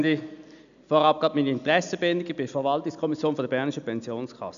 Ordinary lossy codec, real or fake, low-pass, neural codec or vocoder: none; real; 7.2 kHz; none